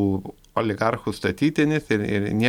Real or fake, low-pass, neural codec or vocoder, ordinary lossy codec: real; 19.8 kHz; none; MP3, 96 kbps